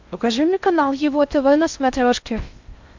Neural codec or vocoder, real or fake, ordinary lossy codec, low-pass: codec, 16 kHz in and 24 kHz out, 0.6 kbps, FocalCodec, streaming, 4096 codes; fake; MP3, 64 kbps; 7.2 kHz